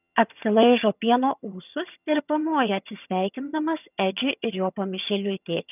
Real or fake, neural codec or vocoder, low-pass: fake; vocoder, 22.05 kHz, 80 mel bands, HiFi-GAN; 3.6 kHz